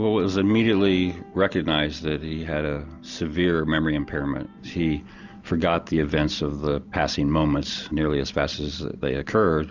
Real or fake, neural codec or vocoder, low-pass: real; none; 7.2 kHz